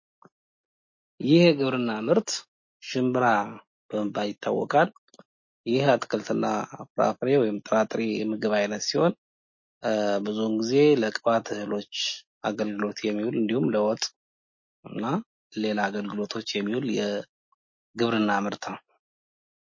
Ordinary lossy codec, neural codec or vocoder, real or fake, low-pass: MP3, 32 kbps; none; real; 7.2 kHz